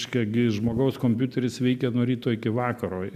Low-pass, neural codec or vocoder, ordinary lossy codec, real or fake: 14.4 kHz; none; AAC, 96 kbps; real